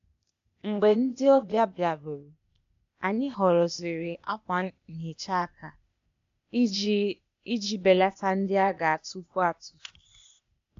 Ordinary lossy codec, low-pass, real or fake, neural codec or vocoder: MP3, 64 kbps; 7.2 kHz; fake; codec, 16 kHz, 0.8 kbps, ZipCodec